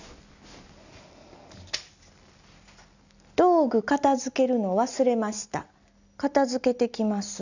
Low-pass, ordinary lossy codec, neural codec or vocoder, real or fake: 7.2 kHz; none; none; real